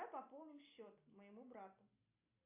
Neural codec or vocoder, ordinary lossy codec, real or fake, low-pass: none; AAC, 32 kbps; real; 3.6 kHz